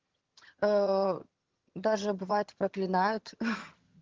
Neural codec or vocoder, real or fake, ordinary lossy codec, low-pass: vocoder, 22.05 kHz, 80 mel bands, HiFi-GAN; fake; Opus, 16 kbps; 7.2 kHz